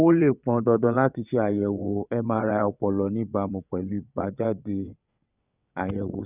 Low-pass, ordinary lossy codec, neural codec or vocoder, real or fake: 3.6 kHz; none; vocoder, 22.05 kHz, 80 mel bands, WaveNeXt; fake